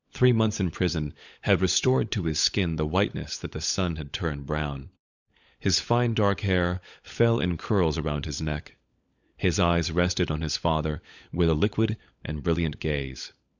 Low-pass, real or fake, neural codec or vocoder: 7.2 kHz; fake; codec, 16 kHz, 16 kbps, FunCodec, trained on LibriTTS, 50 frames a second